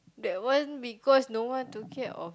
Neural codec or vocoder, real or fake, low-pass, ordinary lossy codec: none; real; none; none